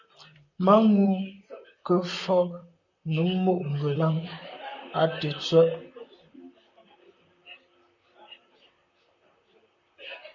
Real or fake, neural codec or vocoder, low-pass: fake; vocoder, 44.1 kHz, 128 mel bands, Pupu-Vocoder; 7.2 kHz